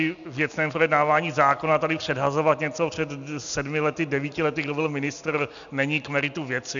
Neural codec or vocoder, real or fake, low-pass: none; real; 7.2 kHz